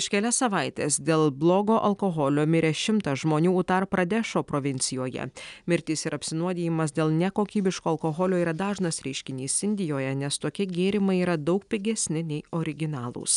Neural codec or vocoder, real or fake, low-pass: none; real; 10.8 kHz